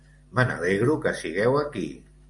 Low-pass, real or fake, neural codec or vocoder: 10.8 kHz; real; none